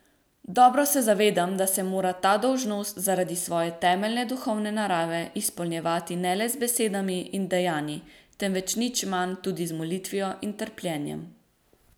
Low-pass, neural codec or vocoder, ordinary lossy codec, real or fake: none; none; none; real